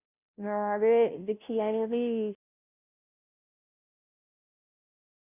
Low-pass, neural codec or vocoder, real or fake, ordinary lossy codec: 3.6 kHz; codec, 16 kHz, 0.5 kbps, FunCodec, trained on Chinese and English, 25 frames a second; fake; none